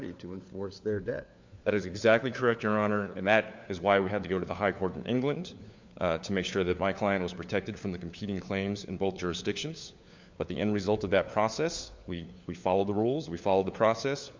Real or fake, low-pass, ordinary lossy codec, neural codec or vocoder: fake; 7.2 kHz; MP3, 64 kbps; codec, 16 kHz, 4 kbps, FunCodec, trained on LibriTTS, 50 frames a second